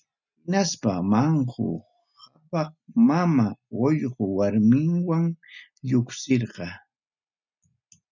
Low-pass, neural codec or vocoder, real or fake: 7.2 kHz; none; real